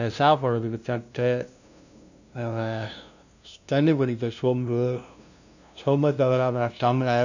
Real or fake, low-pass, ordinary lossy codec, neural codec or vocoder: fake; 7.2 kHz; none; codec, 16 kHz, 0.5 kbps, FunCodec, trained on LibriTTS, 25 frames a second